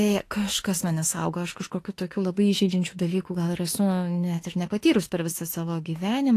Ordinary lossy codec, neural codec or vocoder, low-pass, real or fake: AAC, 48 kbps; autoencoder, 48 kHz, 32 numbers a frame, DAC-VAE, trained on Japanese speech; 14.4 kHz; fake